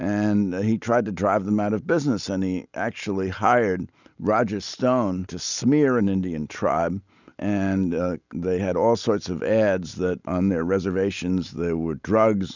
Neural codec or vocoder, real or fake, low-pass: none; real; 7.2 kHz